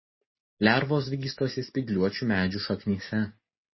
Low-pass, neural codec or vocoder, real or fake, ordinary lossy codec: 7.2 kHz; none; real; MP3, 24 kbps